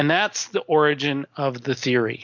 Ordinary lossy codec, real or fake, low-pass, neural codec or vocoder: MP3, 48 kbps; real; 7.2 kHz; none